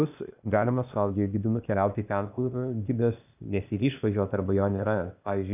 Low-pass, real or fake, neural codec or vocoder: 3.6 kHz; fake; codec, 16 kHz, 0.8 kbps, ZipCodec